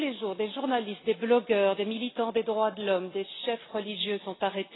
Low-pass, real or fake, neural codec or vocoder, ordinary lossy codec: 7.2 kHz; real; none; AAC, 16 kbps